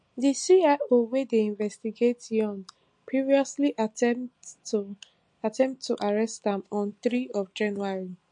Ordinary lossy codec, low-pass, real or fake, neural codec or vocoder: MP3, 48 kbps; 9.9 kHz; real; none